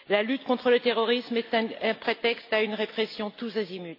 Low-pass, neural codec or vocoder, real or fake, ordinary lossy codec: 5.4 kHz; none; real; AAC, 32 kbps